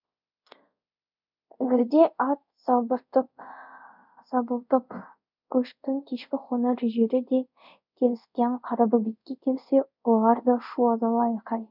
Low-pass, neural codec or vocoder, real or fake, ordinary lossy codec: 5.4 kHz; codec, 24 kHz, 0.5 kbps, DualCodec; fake; none